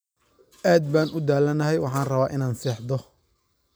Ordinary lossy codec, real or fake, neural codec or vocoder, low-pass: none; real; none; none